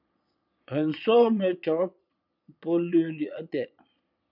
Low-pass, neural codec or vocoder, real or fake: 5.4 kHz; vocoder, 22.05 kHz, 80 mel bands, Vocos; fake